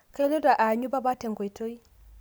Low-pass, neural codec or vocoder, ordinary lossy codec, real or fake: none; none; none; real